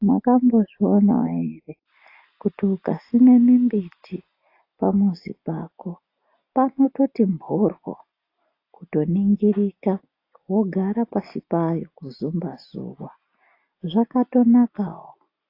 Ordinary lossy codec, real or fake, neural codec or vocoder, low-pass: AAC, 32 kbps; real; none; 5.4 kHz